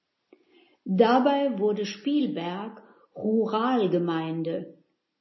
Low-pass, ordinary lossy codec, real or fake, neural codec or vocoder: 7.2 kHz; MP3, 24 kbps; real; none